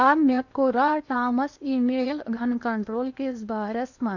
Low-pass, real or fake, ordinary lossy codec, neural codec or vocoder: 7.2 kHz; fake; none; codec, 16 kHz in and 24 kHz out, 0.8 kbps, FocalCodec, streaming, 65536 codes